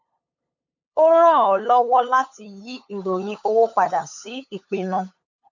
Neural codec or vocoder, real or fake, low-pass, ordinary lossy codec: codec, 16 kHz, 8 kbps, FunCodec, trained on LibriTTS, 25 frames a second; fake; 7.2 kHz; none